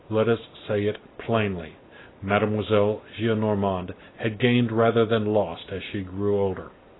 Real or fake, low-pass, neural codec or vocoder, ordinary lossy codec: real; 7.2 kHz; none; AAC, 16 kbps